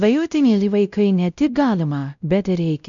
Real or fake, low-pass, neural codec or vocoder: fake; 7.2 kHz; codec, 16 kHz, 0.5 kbps, X-Codec, WavLM features, trained on Multilingual LibriSpeech